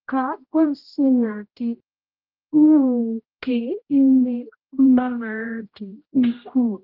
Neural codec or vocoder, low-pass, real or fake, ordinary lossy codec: codec, 16 kHz, 0.5 kbps, X-Codec, HuBERT features, trained on general audio; 5.4 kHz; fake; Opus, 24 kbps